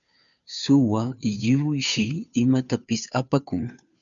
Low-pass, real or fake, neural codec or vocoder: 7.2 kHz; fake; codec, 16 kHz, 2 kbps, FunCodec, trained on Chinese and English, 25 frames a second